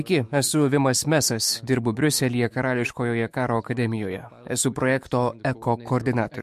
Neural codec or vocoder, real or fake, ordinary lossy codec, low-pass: autoencoder, 48 kHz, 128 numbers a frame, DAC-VAE, trained on Japanese speech; fake; MP3, 64 kbps; 14.4 kHz